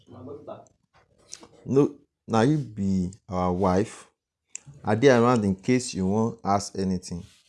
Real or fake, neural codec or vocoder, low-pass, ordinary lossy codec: real; none; none; none